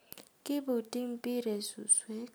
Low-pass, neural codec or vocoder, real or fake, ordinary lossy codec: none; none; real; none